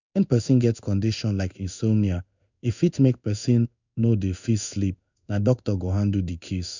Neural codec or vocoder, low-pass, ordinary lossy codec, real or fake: codec, 16 kHz in and 24 kHz out, 1 kbps, XY-Tokenizer; 7.2 kHz; none; fake